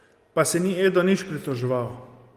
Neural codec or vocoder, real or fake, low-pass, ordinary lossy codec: none; real; 14.4 kHz; Opus, 24 kbps